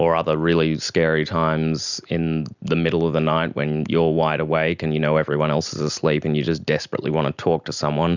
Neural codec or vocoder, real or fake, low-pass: none; real; 7.2 kHz